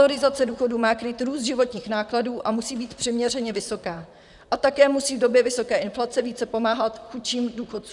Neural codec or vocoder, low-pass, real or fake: vocoder, 44.1 kHz, 128 mel bands, Pupu-Vocoder; 10.8 kHz; fake